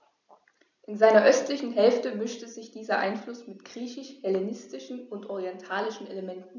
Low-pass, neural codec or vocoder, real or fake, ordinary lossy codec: 7.2 kHz; none; real; none